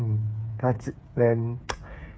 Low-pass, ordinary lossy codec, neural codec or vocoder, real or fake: none; none; codec, 16 kHz, 8 kbps, FreqCodec, smaller model; fake